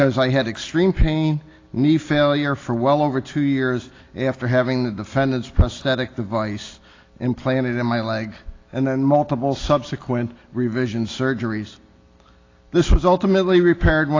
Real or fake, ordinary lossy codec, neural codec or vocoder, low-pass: real; AAC, 32 kbps; none; 7.2 kHz